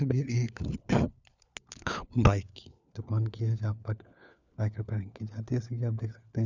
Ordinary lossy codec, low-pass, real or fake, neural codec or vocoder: none; 7.2 kHz; fake; codec, 16 kHz, 4 kbps, FunCodec, trained on LibriTTS, 50 frames a second